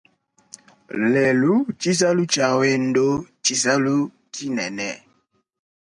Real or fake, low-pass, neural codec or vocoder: real; 10.8 kHz; none